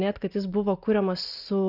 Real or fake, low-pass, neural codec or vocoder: real; 5.4 kHz; none